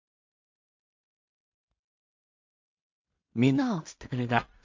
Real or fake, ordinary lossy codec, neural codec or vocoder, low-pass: fake; MP3, 48 kbps; codec, 16 kHz in and 24 kHz out, 0.4 kbps, LongCat-Audio-Codec, two codebook decoder; 7.2 kHz